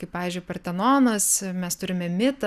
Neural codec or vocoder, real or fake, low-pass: none; real; 14.4 kHz